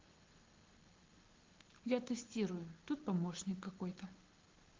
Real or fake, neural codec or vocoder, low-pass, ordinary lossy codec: real; none; 7.2 kHz; Opus, 16 kbps